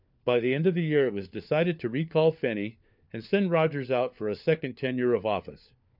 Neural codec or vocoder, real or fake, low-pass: codec, 16 kHz, 4 kbps, FunCodec, trained on LibriTTS, 50 frames a second; fake; 5.4 kHz